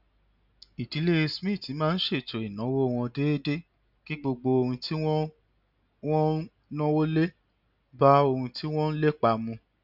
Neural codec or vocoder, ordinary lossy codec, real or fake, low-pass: none; none; real; 5.4 kHz